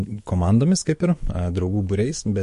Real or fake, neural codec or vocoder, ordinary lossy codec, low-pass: fake; autoencoder, 48 kHz, 128 numbers a frame, DAC-VAE, trained on Japanese speech; MP3, 48 kbps; 14.4 kHz